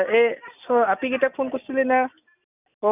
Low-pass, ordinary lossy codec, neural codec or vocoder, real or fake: 3.6 kHz; none; none; real